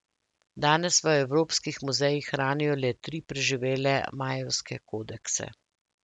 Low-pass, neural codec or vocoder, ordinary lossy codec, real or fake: 10.8 kHz; none; none; real